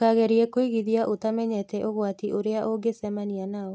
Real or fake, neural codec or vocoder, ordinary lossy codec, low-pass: real; none; none; none